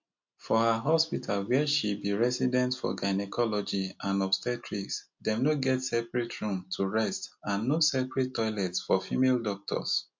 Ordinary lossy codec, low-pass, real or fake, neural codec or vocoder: MP3, 48 kbps; 7.2 kHz; real; none